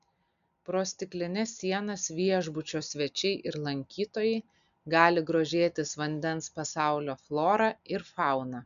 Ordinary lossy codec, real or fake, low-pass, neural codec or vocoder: MP3, 96 kbps; real; 7.2 kHz; none